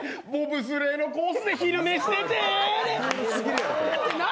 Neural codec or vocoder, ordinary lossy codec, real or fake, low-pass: none; none; real; none